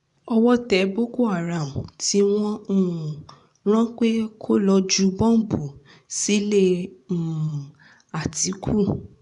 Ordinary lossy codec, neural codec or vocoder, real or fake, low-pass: none; vocoder, 24 kHz, 100 mel bands, Vocos; fake; 10.8 kHz